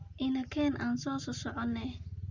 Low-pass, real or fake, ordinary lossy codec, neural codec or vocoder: 7.2 kHz; real; Opus, 64 kbps; none